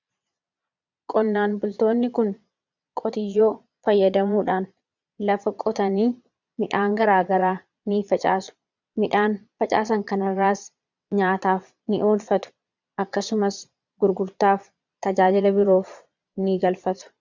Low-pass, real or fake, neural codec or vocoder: 7.2 kHz; fake; vocoder, 22.05 kHz, 80 mel bands, Vocos